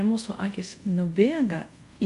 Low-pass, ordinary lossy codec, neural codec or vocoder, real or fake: 10.8 kHz; MP3, 64 kbps; codec, 24 kHz, 0.5 kbps, DualCodec; fake